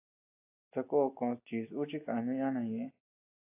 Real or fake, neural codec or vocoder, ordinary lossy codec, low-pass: real; none; AAC, 32 kbps; 3.6 kHz